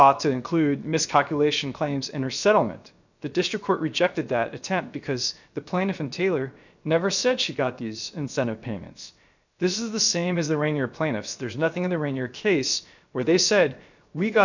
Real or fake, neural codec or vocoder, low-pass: fake; codec, 16 kHz, 0.7 kbps, FocalCodec; 7.2 kHz